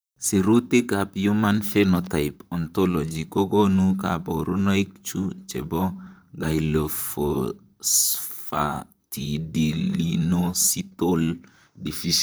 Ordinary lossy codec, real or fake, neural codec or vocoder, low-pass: none; fake; vocoder, 44.1 kHz, 128 mel bands, Pupu-Vocoder; none